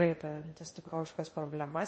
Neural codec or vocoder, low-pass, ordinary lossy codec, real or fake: codec, 16 kHz in and 24 kHz out, 0.6 kbps, FocalCodec, streaming, 2048 codes; 10.8 kHz; MP3, 32 kbps; fake